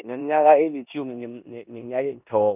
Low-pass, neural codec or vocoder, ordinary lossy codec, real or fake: 3.6 kHz; codec, 16 kHz in and 24 kHz out, 0.9 kbps, LongCat-Audio-Codec, four codebook decoder; none; fake